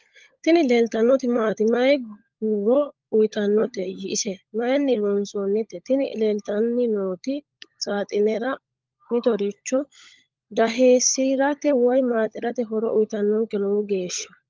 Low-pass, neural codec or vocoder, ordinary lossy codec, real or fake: 7.2 kHz; codec, 16 kHz, 16 kbps, FunCodec, trained on LibriTTS, 50 frames a second; Opus, 32 kbps; fake